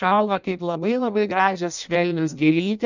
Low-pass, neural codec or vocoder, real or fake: 7.2 kHz; codec, 16 kHz in and 24 kHz out, 0.6 kbps, FireRedTTS-2 codec; fake